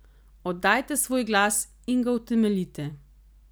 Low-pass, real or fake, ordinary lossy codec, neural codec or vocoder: none; real; none; none